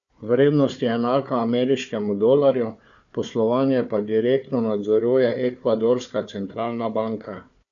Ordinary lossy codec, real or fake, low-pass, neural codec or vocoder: MP3, 96 kbps; fake; 7.2 kHz; codec, 16 kHz, 4 kbps, FunCodec, trained on Chinese and English, 50 frames a second